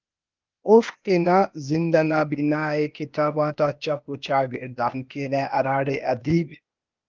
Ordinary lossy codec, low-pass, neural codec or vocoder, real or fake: Opus, 24 kbps; 7.2 kHz; codec, 16 kHz, 0.8 kbps, ZipCodec; fake